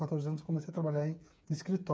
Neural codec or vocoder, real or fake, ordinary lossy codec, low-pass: codec, 16 kHz, 8 kbps, FreqCodec, smaller model; fake; none; none